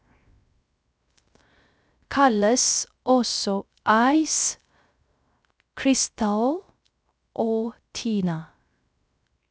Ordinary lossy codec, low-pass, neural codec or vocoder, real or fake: none; none; codec, 16 kHz, 0.3 kbps, FocalCodec; fake